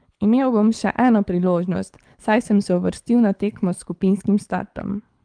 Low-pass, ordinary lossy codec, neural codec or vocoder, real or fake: 9.9 kHz; Opus, 32 kbps; codec, 24 kHz, 6 kbps, HILCodec; fake